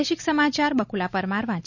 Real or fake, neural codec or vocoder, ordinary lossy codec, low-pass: real; none; none; 7.2 kHz